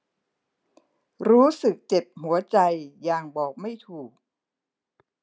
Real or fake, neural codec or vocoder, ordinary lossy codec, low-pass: real; none; none; none